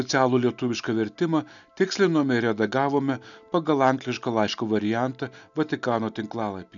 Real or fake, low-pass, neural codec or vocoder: real; 7.2 kHz; none